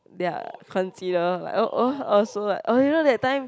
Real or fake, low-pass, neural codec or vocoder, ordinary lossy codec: real; none; none; none